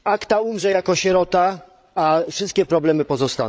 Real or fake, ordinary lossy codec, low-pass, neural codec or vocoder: fake; none; none; codec, 16 kHz, 8 kbps, FreqCodec, larger model